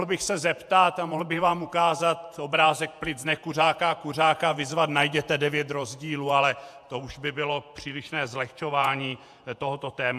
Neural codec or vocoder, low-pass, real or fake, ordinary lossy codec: vocoder, 48 kHz, 128 mel bands, Vocos; 14.4 kHz; fake; AAC, 96 kbps